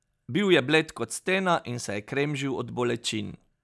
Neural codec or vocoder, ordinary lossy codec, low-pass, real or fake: none; none; none; real